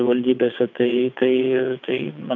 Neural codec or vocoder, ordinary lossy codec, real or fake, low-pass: vocoder, 22.05 kHz, 80 mel bands, WaveNeXt; MP3, 64 kbps; fake; 7.2 kHz